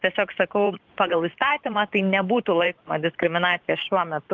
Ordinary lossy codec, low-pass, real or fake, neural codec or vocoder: Opus, 24 kbps; 7.2 kHz; fake; vocoder, 44.1 kHz, 128 mel bands every 512 samples, BigVGAN v2